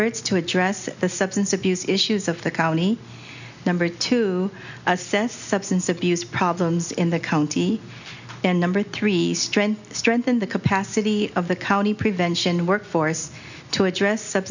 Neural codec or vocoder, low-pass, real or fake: none; 7.2 kHz; real